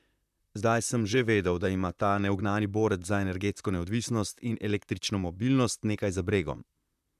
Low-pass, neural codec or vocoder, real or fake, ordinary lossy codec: 14.4 kHz; vocoder, 44.1 kHz, 128 mel bands, Pupu-Vocoder; fake; none